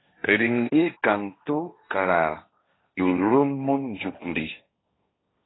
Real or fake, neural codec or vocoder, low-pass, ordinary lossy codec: fake; codec, 16 kHz, 1.1 kbps, Voila-Tokenizer; 7.2 kHz; AAC, 16 kbps